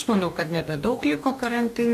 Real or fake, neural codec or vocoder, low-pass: fake; codec, 44.1 kHz, 2.6 kbps, DAC; 14.4 kHz